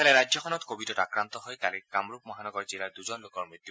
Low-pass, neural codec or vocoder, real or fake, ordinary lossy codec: none; none; real; none